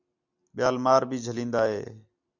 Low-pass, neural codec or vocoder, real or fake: 7.2 kHz; none; real